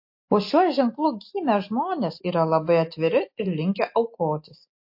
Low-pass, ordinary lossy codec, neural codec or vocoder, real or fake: 5.4 kHz; MP3, 32 kbps; none; real